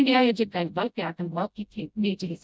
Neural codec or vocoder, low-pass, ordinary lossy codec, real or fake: codec, 16 kHz, 0.5 kbps, FreqCodec, smaller model; none; none; fake